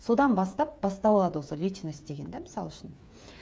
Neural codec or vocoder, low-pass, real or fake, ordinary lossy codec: codec, 16 kHz, 8 kbps, FreqCodec, smaller model; none; fake; none